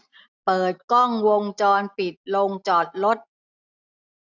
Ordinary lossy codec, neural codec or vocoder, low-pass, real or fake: none; none; 7.2 kHz; real